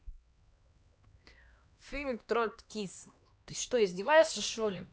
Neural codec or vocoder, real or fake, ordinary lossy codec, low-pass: codec, 16 kHz, 2 kbps, X-Codec, HuBERT features, trained on LibriSpeech; fake; none; none